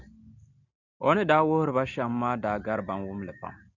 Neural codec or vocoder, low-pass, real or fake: none; 7.2 kHz; real